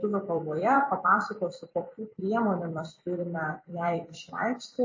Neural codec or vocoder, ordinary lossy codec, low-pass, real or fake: vocoder, 44.1 kHz, 128 mel bands every 256 samples, BigVGAN v2; MP3, 32 kbps; 7.2 kHz; fake